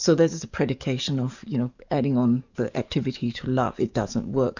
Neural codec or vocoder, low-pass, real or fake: codec, 44.1 kHz, 7.8 kbps, DAC; 7.2 kHz; fake